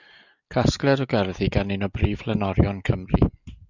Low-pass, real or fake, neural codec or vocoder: 7.2 kHz; real; none